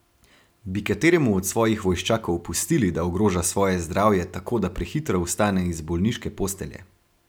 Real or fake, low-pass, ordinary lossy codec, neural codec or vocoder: real; none; none; none